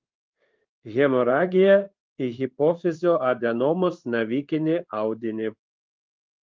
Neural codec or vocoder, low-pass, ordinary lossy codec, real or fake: codec, 16 kHz in and 24 kHz out, 1 kbps, XY-Tokenizer; 7.2 kHz; Opus, 32 kbps; fake